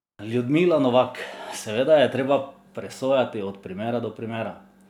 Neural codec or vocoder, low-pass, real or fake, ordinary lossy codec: none; 19.8 kHz; real; none